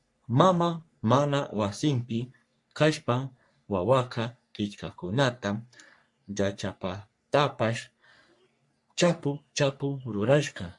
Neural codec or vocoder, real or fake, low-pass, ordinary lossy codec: codec, 44.1 kHz, 3.4 kbps, Pupu-Codec; fake; 10.8 kHz; MP3, 64 kbps